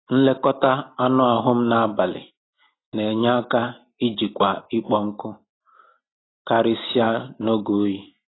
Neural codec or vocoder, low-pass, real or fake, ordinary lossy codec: none; 7.2 kHz; real; AAC, 16 kbps